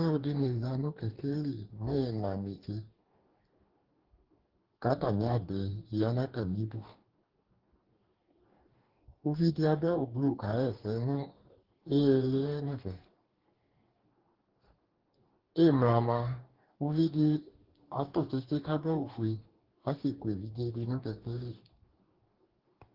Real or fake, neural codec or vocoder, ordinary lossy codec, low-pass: fake; codec, 44.1 kHz, 2.6 kbps, DAC; Opus, 16 kbps; 5.4 kHz